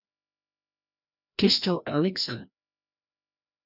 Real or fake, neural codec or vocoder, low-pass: fake; codec, 16 kHz, 1 kbps, FreqCodec, larger model; 5.4 kHz